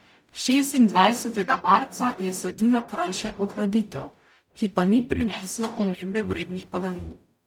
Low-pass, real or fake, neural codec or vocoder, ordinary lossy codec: 19.8 kHz; fake; codec, 44.1 kHz, 0.9 kbps, DAC; none